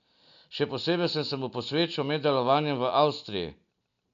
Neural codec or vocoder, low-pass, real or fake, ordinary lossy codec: none; 7.2 kHz; real; none